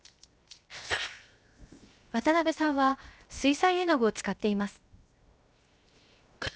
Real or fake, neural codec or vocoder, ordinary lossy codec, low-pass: fake; codec, 16 kHz, 0.7 kbps, FocalCodec; none; none